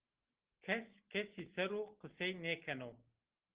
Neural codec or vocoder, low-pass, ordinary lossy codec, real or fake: none; 3.6 kHz; Opus, 16 kbps; real